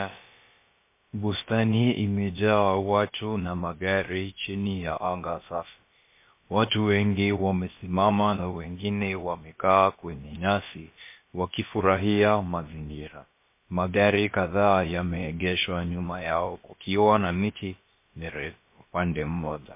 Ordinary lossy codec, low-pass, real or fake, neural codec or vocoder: MP3, 24 kbps; 3.6 kHz; fake; codec, 16 kHz, about 1 kbps, DyCAST, with the encoder's durations